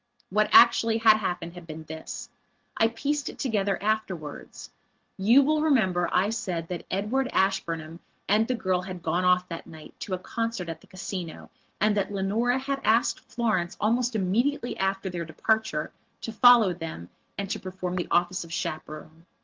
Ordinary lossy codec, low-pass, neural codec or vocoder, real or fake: Opus, 16 kbps; 7.2 kHz; none; real